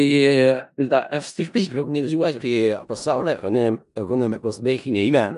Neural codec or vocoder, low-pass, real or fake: codec, 16 kHz in and 24 kHz out, 0.4 kbps, LongCat-Audio-Codec, four codebook decoder; 10.8 kHz; fake